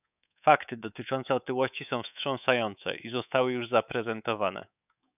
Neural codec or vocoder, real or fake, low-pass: codec, 24 kHz, 3.1 kbps, DualCodec; fake; 3.6 kHz